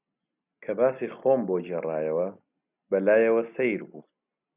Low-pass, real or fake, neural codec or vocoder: 3.6 kHz; real; none